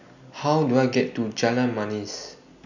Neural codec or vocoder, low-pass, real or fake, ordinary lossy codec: none; 7.2 kHz; real; none